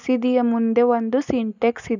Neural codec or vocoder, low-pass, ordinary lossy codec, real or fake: none; 7.2 kHz; none; real